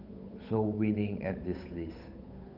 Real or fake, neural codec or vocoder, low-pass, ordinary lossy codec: fake; codec, 16 kHz, 8 kbps, FunCodec, trained on Chinese and English, 25 frames a second; 5.4 kHz; none